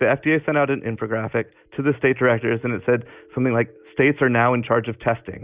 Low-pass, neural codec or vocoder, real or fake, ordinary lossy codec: 3.6 kHz; none; real; Opus, 64 kbps